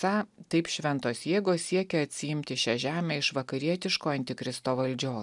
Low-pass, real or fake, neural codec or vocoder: 10.8 kHz; real; none